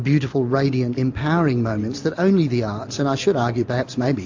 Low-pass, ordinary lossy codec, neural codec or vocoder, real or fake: 7.2 kHz; AAC, 48 kbps; none; real